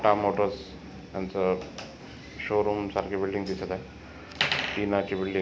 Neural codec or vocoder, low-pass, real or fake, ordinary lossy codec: none; none; real; none